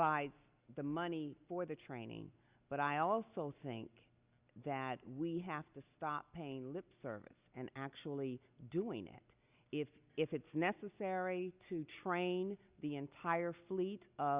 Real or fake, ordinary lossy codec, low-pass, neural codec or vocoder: real; Opus, 64 kbps; 3.6 kHz; none